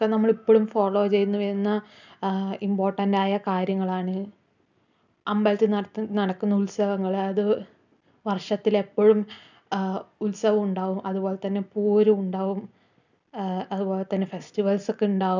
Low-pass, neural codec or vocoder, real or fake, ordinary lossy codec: 7.2 kHz; none; real; none